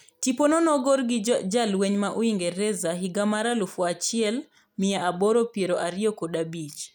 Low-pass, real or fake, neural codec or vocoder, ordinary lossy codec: none; real; none; none